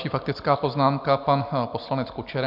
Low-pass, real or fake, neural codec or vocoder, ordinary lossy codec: 5.4 kHz; real; none; MP3, 48 kbps